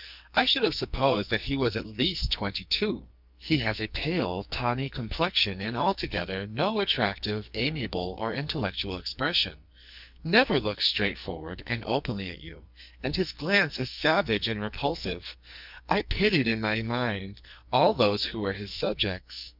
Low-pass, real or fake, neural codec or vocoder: 5.4 kHz; fake; codec, 44.1 kHz, 2.6 kbps, SNAC